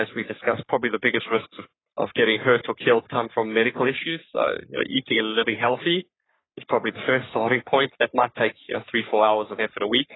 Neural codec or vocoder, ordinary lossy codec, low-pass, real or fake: codec, 44.1 kHz, 3.4 kbps, Pupu-Codec; AAC, 16 kbps; 7.2 kHz; fake